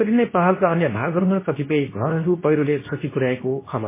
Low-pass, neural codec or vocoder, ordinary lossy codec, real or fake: 3.6 kHz; codec, 24 kHz, 0.9 kbps, WavTokenizer, medium speech release version 2; MP3, 16 kbps; fake